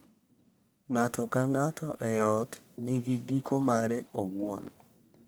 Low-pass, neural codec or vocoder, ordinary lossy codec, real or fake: none; codec, 44.1 kHz, 1.7 kbps, Pupu-Codec; none; fake